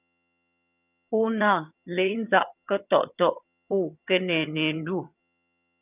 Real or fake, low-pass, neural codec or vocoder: fake; 3.6 kHz; vocoder, 22.05 kHz, 80 mel bands, HiFi-GAN